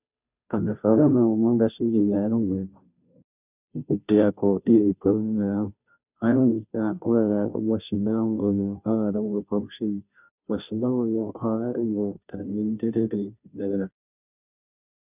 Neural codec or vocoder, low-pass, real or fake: codec, 16 kHz, 0.5 kbps, FunCodec, trained on Chinese and English, 25 frames a second; 3.6 kHz; fake